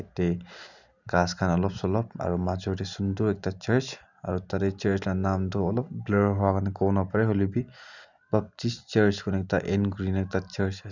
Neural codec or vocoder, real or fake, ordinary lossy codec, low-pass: none; real; none; 7.2 kHz